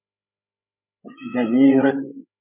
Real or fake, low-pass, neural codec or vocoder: fake; 3.6 kHz; codec, 16 kHz, 16 kbps, FreqCodec, larger model